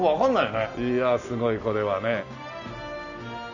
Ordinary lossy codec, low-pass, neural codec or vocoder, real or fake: none; 7.2 kHz; none; real